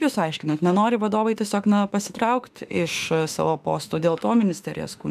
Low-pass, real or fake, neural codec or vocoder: 14.4 kHz; fake; autoencoder, 48 kHz, 32 numbers a frame, DAC-VAE, trained on Japanese speech